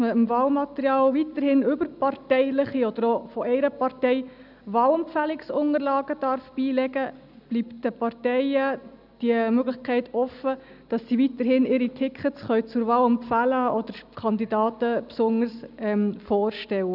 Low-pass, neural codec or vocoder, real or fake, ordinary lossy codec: 5.4 kHz; none; real; none